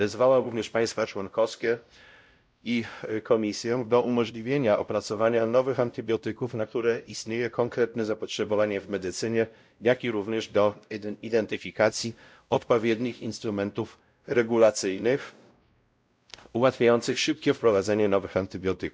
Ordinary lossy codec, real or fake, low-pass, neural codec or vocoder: none; fake; none; codec, 16 kHz, 0.5 kbps, X-Codec, WavLM features, trained on Multilingual LibriSpeech